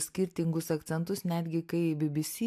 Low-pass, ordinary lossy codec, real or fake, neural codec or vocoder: 14.4 kHz; AAC, 96 kbps; real; none